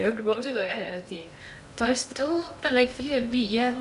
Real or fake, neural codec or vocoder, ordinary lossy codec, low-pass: fake; codec, 16 kHz in and 24 kHz out, 0.6 kbps, FocalCodec, streaming, 2048 codes; none; 10.8 kHz